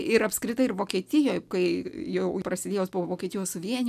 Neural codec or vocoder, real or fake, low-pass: none; real; 14.4 kHz